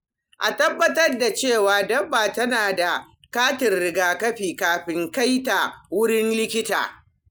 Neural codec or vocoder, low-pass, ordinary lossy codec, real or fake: none; none; none; real